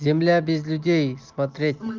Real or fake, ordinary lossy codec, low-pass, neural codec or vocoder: real; Opus, 32 kbps; 7.2 kHz; none